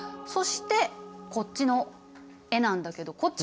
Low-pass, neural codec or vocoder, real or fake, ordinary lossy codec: none; none; real; none